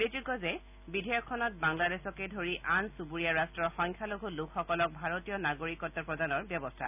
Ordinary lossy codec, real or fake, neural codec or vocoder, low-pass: none; real; none; 3.6 kHz